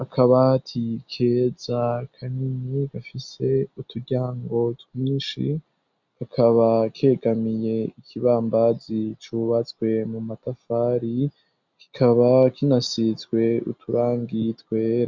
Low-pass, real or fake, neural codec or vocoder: 7.2 kHz; real; none